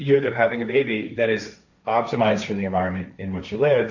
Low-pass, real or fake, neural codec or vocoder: 7.2 kHz; fake; codec, 16 kHz, 1.1 kbps, Voila-Tokenizer